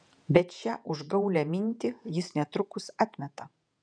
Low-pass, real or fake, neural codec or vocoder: 9.9 kHz; fake; vocoder, 48 kHz, 128 mel bands, Vocos